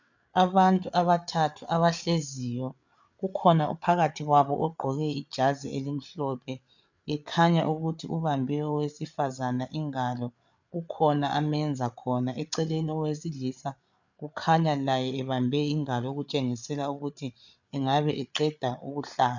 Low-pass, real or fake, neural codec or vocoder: 7.2 kHz; fake; codec, 16 kHz, 8 kbps, FreqCodec, larger model